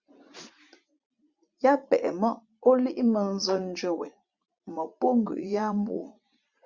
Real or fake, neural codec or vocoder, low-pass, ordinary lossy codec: fake; vocoder, 44.1 kHz, 80 mel bands, Vocos; 7.2 kHz; Opus, 64 kbps